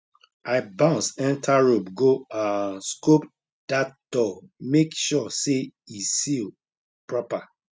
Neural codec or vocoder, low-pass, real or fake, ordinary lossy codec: none; none; real; none